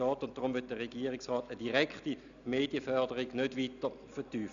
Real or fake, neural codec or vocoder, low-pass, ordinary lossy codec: real; none; 7.2 kHz; none